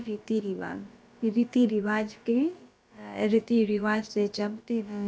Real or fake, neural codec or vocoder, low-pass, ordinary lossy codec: fake; codec, 16 kHz, about 1 kbps, DyCAST, with the encoder's durations; none; none